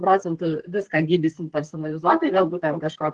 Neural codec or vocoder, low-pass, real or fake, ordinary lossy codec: codec, 32 kHz, 1.9 kbps, SNAC; 10.8 kHz; fake; Opus, 16 kbps